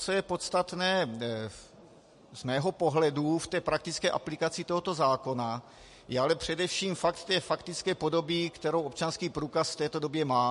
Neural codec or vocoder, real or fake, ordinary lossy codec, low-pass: none; real; MP3, 48 kbps; 14.4 kHz